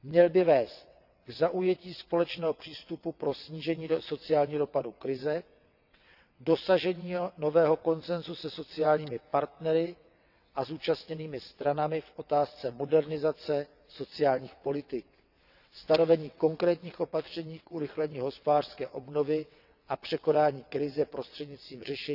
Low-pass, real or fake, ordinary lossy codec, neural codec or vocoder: 5.4 kHz; fake; none; vocoder, 22.05 kHz, 80 mel bands, WaveNeXt